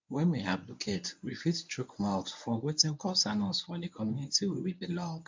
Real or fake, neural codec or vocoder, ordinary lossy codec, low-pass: fake; codec, 24 kHz, 0.9 kbps, WavTokenizer, medium speech release version 1; MP3, 64 kbps; 7.2 kHz